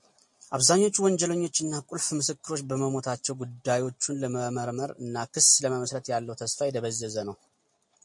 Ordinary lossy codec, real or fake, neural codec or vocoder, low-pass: MP3, 48 kbps; real; none; 10.8 kHz